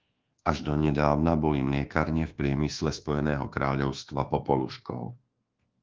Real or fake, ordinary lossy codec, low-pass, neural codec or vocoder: fake; Opus, 16 kbps; 7.2 kHz; codec, 24 kHz, 1.2 kbps, DualCodec